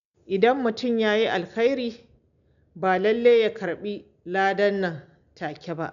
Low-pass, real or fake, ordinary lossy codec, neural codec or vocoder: 7.2 kHz; real; none; none